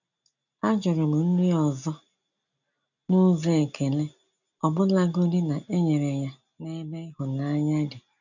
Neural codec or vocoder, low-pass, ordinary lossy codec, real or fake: none; 7.2 kHz; none; real